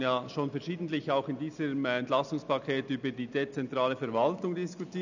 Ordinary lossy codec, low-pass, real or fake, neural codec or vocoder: none; 7.2 kHz; real; none